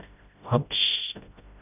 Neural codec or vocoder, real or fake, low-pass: codec, 16 kHz, 0.5 kbps, FreqCodec, smaller model; fake; 3.6 kHz